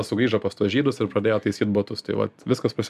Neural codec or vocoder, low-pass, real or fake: none; 14.4 kHz; real